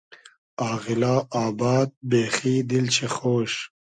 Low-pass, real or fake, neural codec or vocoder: 9.9 kHz; real; none